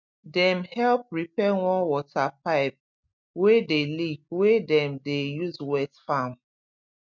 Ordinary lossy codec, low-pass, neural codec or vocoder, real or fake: MP3, 64 kbps; 7.2 kHz; none; real